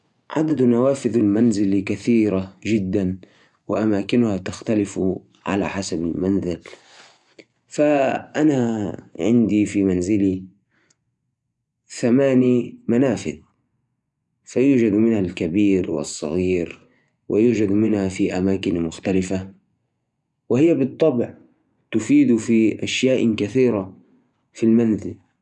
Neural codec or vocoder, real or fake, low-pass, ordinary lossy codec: vocoder, 24 kHz, 100 mel bands, Vocos; fake; 10.8 kHz; none